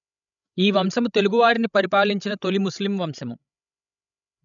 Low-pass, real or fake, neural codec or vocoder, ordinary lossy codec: 7.2 kHz; fake; codec, 16 kHz, 16 kbps, FreqCodec, larger model; none